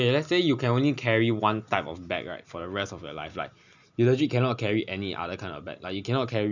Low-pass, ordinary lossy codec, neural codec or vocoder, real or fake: 7.2 kHz; none; none; real